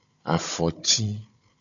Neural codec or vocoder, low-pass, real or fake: codec, 16 kHz, 16 kbps, FunCodec, trained on Chinese and English, 50 frames a second; 7.2 kHz; fake